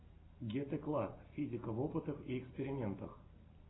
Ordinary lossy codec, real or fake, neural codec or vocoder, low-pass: AAC, 16 kbps; real; none; 7.2 kHz